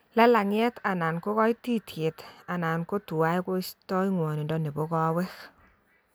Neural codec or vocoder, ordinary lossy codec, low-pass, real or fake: none; none; none; real